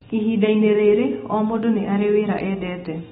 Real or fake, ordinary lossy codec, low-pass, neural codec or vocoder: real; AAC, 16 kbps; 19.8 kHz; none